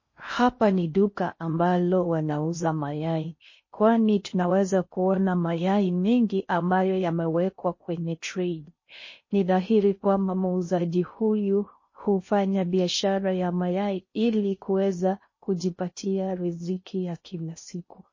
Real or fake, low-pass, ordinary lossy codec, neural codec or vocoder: fake; 7.2 kHz; MP3, 32 kbps; codec, 16 kHz in and 24 kHz out, 0.6 kbps, FocalCodec, streaming, 4096 codes